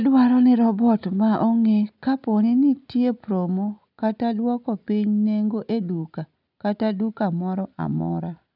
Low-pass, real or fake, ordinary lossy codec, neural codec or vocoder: 5.4 kHz; real; none; none